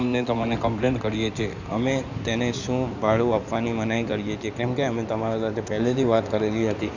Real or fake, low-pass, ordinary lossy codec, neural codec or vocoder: fake; 7.2 kHz; none; codec, 16 kHz in and 24 kHz out, 2.2 kbps, FireRedTTS-2 codec